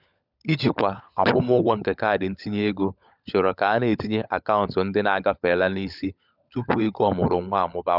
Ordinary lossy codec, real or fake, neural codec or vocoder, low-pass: none; fake; codec, 16 kHz, 16 kbps, FunCodec, trained on LibriTTS, 50 frames a second; 5.4 kHz